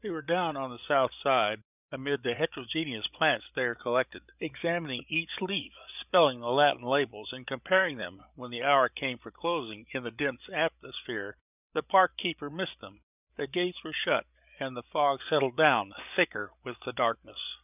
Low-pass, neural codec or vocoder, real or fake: 3.6 kHz; codec, 16 kHz, 8 kbps, FreqCodec, larger model; fake